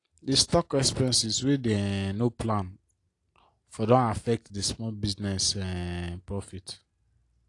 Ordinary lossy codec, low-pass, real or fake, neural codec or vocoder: AAC, 48 kbps; 10.8 kHz; real; none